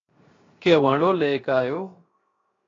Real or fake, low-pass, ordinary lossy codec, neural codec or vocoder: fake; 7.2 kHz; AAC, 32 kbps; codec, 16 kHz, 0.7 kbps, FocalCodec